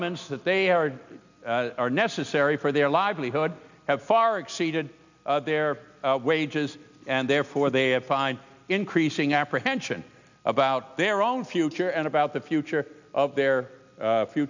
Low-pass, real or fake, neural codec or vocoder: 7.2 kHz; real; none